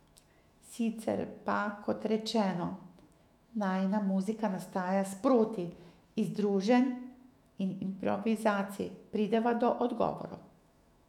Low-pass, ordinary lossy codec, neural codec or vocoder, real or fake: 19.8 kHz; none; autoencoder, 48 kHz, 128 numbers a frame, DAC-VAE, trained on Japanese speech; fake